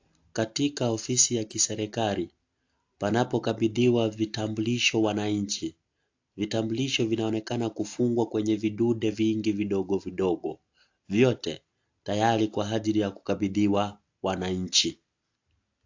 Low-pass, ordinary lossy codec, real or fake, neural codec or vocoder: 7.2 kHz; AAC, 48 kbps; real; none